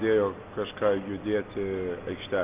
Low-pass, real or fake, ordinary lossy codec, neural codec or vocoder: 3.6 kHz; real; Opus, 24 kbps; none